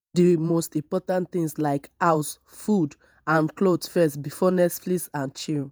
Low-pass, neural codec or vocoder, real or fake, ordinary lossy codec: none; vocoder, 48 kHz, 128 mel bands, Vocos; fake; none